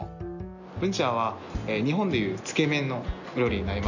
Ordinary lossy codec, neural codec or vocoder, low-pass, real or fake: none; none; 7.2 kHz; real